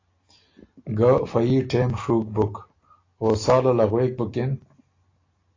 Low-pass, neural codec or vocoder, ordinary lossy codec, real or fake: 7.2 kHz; none; AAC, 32 kbps; real